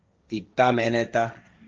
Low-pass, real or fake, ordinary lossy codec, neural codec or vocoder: 7.2 kHz; fake; Opus, 24 kbps; codec, 16 kHz, 1.1 kbps, Voila-Tokenizer